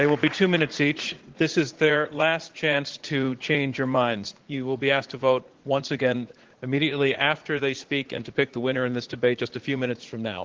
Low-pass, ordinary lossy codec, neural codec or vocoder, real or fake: 7.2 kHz; Opus, 16 kbps; vocoder, 44.1 kHz, 80 mel bands, Vocos; fake